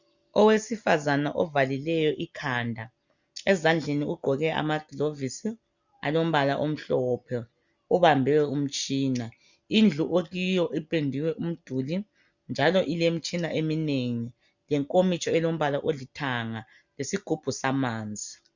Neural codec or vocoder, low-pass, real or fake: none; 7.2 kHz; real